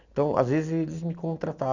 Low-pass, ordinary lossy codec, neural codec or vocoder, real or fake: 7.2 kHz; none; none; real